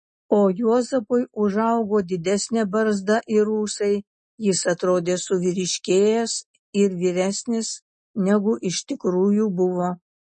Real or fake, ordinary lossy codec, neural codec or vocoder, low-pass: real; MP3, 32 kbps; none; 10.8 kHz